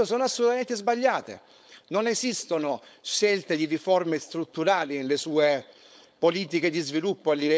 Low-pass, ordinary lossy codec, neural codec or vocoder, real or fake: none; none; codec, 16 kHz, 4.8 kbps, FACodec; fake